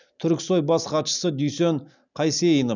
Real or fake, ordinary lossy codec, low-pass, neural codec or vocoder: real; none; 7.2 kHz; none